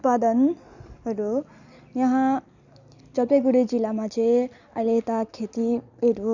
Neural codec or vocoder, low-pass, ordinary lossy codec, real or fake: none; 7.2 kHz; none; real